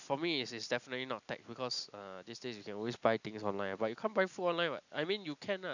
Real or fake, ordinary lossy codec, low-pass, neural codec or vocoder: real; none; 7.2 kHz; none